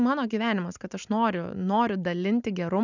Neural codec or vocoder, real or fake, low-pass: none; real; 7.2 kHz